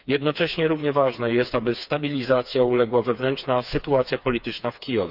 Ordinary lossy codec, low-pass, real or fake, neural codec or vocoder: none; 5.4 kHz; fake; codec, 16 kHz, 4 kbps, FreqCodec, smaller model